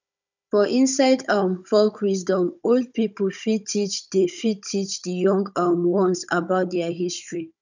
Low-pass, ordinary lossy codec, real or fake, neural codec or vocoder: 7.2 kHz; none; fake; codec, 16 kHz, 16 kbps, FunCodec, trained on Chinese and English, 50 frames a second